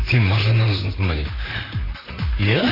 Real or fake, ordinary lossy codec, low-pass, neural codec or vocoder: fake; AAC, 24 kbps; 5.4 kHz; vocoder, 44.1 kHz, 128 mel bands, Pupu-Vocoder